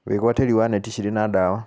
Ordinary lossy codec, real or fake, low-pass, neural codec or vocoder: none; real; none; none